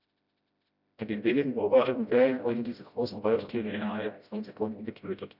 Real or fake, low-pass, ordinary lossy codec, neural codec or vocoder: fake; 5.4 kHz; none; codec, 16 kHz, 0.5 kbps, FreqCodec, smaller model